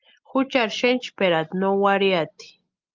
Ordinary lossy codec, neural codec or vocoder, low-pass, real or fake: Opus, 24 kbps; none; 7.2 kHz; real